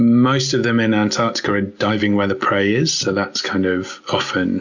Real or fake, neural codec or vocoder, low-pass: real; none; 7.2 kHz